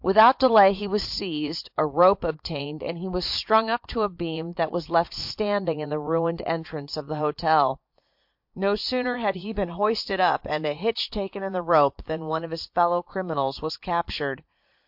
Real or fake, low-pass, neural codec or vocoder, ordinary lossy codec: fake; 5.4 kHz; vocoder, 44.1 kHz, 80 mel bands, Vocos; MP3, 48 kbps